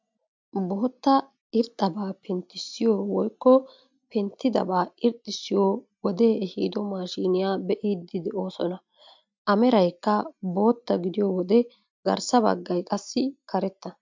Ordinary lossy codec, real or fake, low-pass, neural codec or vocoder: MP3, 64 kbps; real; 7.2 kHz; none